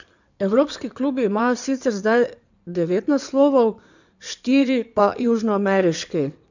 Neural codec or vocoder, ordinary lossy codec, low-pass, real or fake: codec, 16 kHz in and 24 kHz out, 2.2 kbps, FireRedTTS-2 codec; none; 7.2 kHz; fake